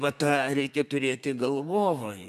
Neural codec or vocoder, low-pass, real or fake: codec, 32 kHz, 1.9 kbps, SNAC; 14.4 kHz; fake